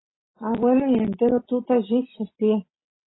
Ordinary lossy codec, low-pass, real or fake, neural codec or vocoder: AAC, 16 kbps; 7.2 kHz; real; none